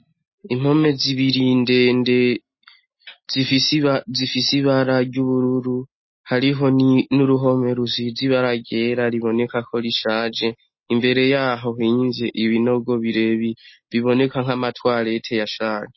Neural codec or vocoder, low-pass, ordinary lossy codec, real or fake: none; 7.2 kHz; MP3, 24 kbps; real